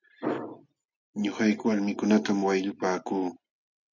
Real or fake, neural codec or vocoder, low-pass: real; none; 7.2 kHz